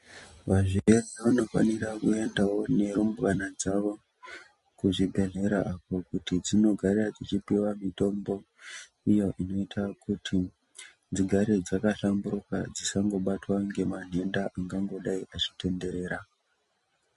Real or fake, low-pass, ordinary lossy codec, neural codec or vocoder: real; 14.4 kHz; MP3, 48 kbps; none